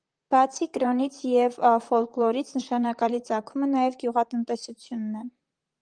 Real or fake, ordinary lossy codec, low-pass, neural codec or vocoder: fake; Opus, 24 kbps; 9.9 kHz; vocoder, 44.1 kHz, 128 mel bands, Pupu-Vocoder